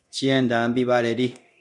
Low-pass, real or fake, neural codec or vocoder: 10.8 kHz; fake; codec, 24 kHz, 0.9 kbps, DualCodec